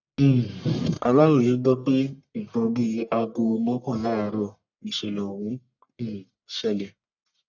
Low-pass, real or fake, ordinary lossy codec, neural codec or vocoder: 7.2 kHz; fake; none; codec, 44.1 kHz, 1.7 kbps, Pupu-Codec